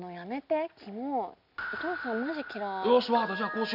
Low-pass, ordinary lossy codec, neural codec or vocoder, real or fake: 5.4 kHz; none; none; real